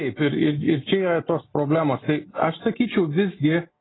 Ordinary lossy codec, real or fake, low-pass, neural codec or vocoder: AAC, 16 kbps; real; 7.2 kHz; none